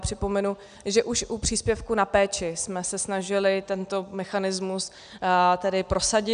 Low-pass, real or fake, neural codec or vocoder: 9.9 kHz; real; none